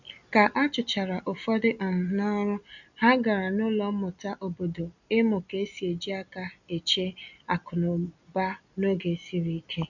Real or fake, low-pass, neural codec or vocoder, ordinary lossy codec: real; 7.2 kHz; none; none